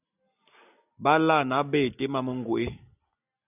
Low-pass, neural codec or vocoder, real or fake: 3.6 kHz; none; real